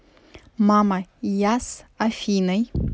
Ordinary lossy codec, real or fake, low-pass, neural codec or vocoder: none; real; none; none